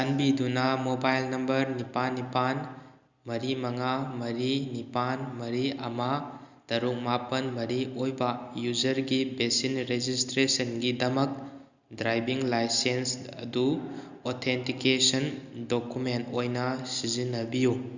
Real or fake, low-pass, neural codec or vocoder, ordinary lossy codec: real; 7.2 kHz; none; Opus, 64 kbps